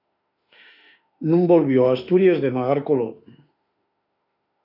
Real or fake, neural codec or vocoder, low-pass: fake; autoencoder, 48 kHz, 32 numbers a frame, DAC-VAE, trained on Japanese speech; 5.4 kHz